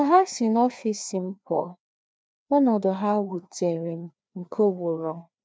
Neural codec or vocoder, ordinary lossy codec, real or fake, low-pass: codec, 16 kHz, 2 kbps, FreqCodec, larger model; none; fake; none